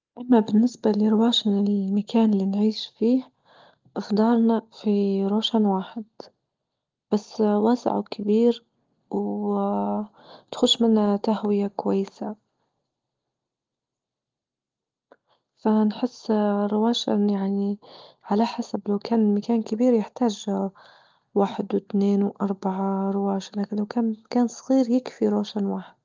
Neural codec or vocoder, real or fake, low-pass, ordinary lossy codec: none; real; 7.2 kHz; Opus, 32 kbps